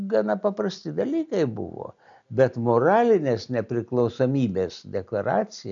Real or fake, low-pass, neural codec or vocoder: real; 7.2 kHz; none